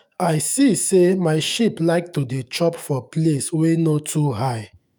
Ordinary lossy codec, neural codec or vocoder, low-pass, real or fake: none; autoencoder, 48 kHz, 128 numbers a frame, DAC-VAE, trained on Japanese speech; none; fake